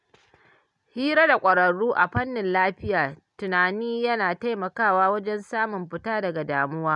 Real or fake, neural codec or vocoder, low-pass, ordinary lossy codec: real; none; 10.8 kHz; none